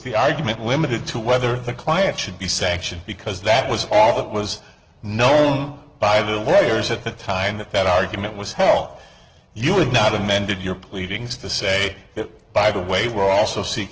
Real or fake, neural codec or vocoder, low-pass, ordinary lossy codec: real; none; 7.2 kHz; Opus, 16 kbps